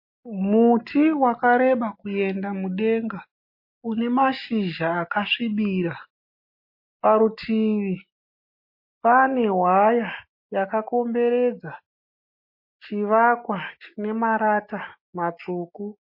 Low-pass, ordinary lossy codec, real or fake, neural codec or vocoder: 5.4 kHz; MP3, 32 kbps; real; none